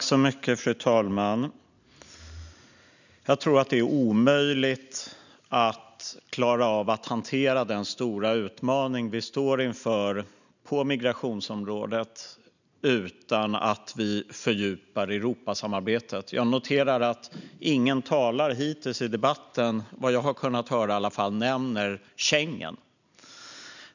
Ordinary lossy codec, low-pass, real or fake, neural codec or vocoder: none; 7.2 kHz; real; none